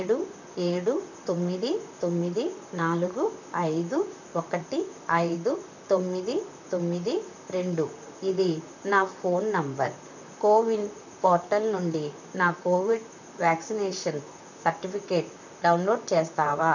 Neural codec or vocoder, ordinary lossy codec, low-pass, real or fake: vocoder, 44.1 kHz, 128 mel bands, Pupu-Vocoder; none; 7.2 kHz; fake